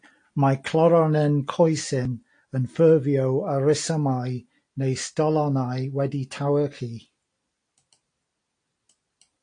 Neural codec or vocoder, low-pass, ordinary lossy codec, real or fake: none; 9.9 kHz; AAC, 48 kbps; real